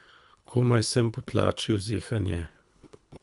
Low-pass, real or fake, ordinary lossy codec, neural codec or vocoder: 10.8 kHz; fake; none; codec, 24 kHz, 3 kbps, HILCodec